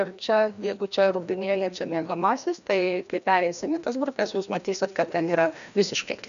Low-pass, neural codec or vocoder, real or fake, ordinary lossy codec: 7.2 kHz; codec, 16 kHz, 1 kbps, FreqCodec, larger model; fake; MP3, 96 kbps